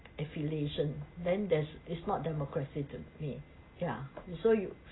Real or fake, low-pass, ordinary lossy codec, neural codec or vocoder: real; 7.2 kHz; AAC, 16 kbps; none